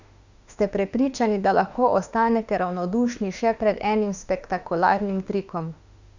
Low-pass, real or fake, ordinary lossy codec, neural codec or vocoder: 7.2 kHz; fake; none; autoencoder, 48 kHz, 32 numbers a frame, DAC-VAE, trained on Japanese speech